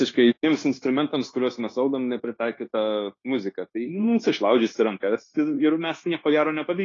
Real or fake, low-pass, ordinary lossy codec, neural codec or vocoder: fake; 7.2 kHz; AAC, 32 kbps; codec, 16 kHz, 0.9 kbps, LongCat-Audio-Codec